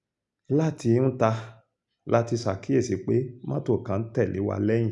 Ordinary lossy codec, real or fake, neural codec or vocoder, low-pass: none; real; none; 10.8 kHz